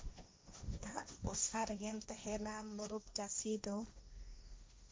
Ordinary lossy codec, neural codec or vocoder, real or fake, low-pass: none; codec, 16 kHz, 1.1 kbps, Voila-Tokenizer; fake; none